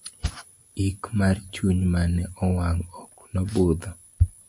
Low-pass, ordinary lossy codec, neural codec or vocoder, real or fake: 10.8 kHz; MP3, 48 kbps; none; real